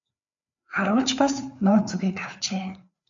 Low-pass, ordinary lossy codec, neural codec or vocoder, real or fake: 7.2 kHz; AAC, 64 kbps; codec, 16 kHz, 4 kbps, FreqCodec, larger model; fake